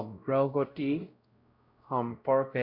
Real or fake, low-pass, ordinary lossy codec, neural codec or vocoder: fake; 5.4 kHz; Opus, 64 kbps; codec, 16 kHz, 0.5 kbps, X-Codec, WavLM features, trained on Multilingual LibriSpeech